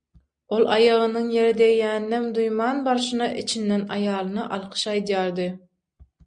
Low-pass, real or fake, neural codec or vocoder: 9.9 kHz; real; none